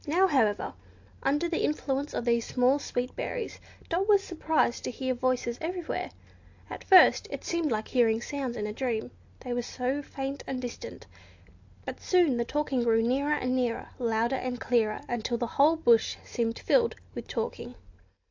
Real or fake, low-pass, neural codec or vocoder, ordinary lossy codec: real; 7.2 kHz; none; AAC, 48 kbps